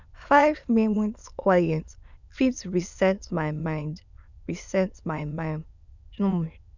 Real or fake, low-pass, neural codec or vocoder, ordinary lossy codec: fake; 7.2 kHz; autoencoder, 22.05 kHz, a latent of 192 numbers a frame, VITS, trained on many speakers; none